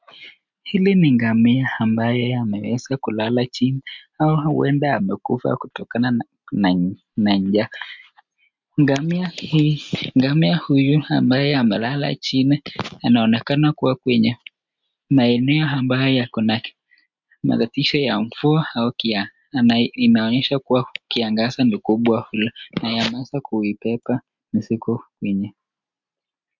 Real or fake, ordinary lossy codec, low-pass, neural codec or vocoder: real; MP3, 64 kbps; 7.2 kHz; none